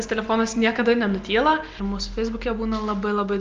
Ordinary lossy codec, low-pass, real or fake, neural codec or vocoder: Opus, 32 kbps; 7.2 kHz; real; none